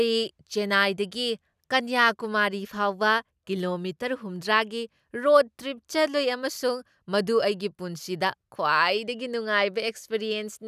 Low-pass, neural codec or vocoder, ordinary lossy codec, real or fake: 14.4 kHz; none; none; real